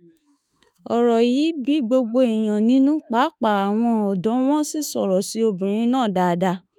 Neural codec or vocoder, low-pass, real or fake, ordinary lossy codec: autoencoder, 48 kHz, 32 numbers a frame, DAC-VAE, trained on Japanese speech; 19.8 kHz; fake; none